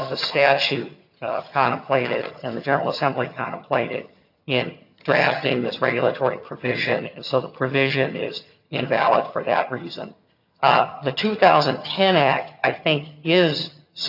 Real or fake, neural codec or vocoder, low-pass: fake; vocoder, 22.05 kHz, 80 mel bands, HiFi-GAN; 5.4 kHz